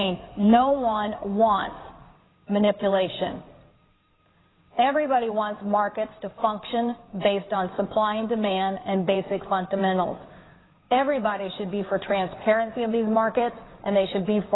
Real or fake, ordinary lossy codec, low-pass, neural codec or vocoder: fake; AAC, 16 kbps; 7.2 kHz; codec, 16 kHz in and 24 kHz out, 2.2 kbps, FireRedTTS-2 codec